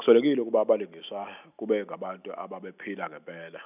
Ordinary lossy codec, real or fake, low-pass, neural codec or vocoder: none; real; 3.6 kHz; none